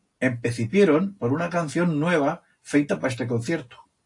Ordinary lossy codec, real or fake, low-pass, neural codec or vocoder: AAC, 48 kbps; real; 10.8 kHz; none